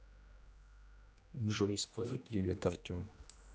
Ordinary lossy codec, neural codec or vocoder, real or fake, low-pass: none; codec, 16 kHz, 1 kbps, X-Codec, HuBERT features, trained on general audio; fake; none